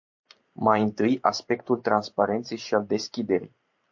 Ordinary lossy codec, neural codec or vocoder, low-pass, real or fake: MP3, 48 kbps; vocoder, 44.1 kHz, 128 mel bands every 512 samples, BigVGAN v2; 7.2 kHz; fake